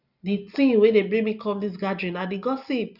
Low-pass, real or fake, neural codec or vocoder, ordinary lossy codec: 5.4 kHz; real; none; none